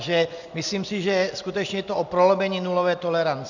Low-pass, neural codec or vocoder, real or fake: 7.2 kHz; none; real